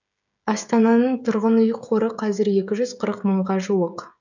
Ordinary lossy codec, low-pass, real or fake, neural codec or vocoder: none; 7.2 kHz; fake; codec, 16 kHz, 16 kbps, FreqCodec, smaller model